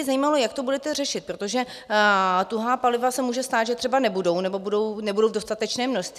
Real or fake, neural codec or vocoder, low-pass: real; none; 14.4 kHz